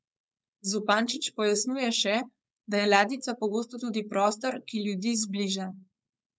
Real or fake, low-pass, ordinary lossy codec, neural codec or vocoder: fake; none; none; codec, 16 kHz, 4.8 kbps, FACodec